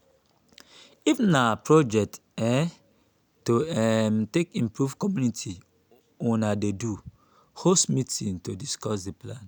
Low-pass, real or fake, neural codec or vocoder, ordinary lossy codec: none; real; none; none